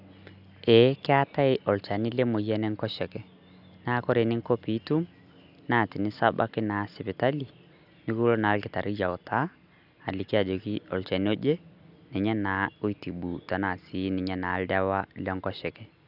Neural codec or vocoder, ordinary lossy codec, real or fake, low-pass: none; none; real; 5.4 kHz